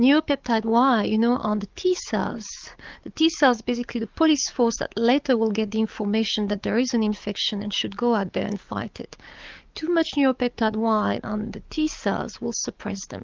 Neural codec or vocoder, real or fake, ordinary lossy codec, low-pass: codec, 44.1 kHz, 7.8 kbps, DAC; fake; Opus, 24 kbps; 7.2 kHz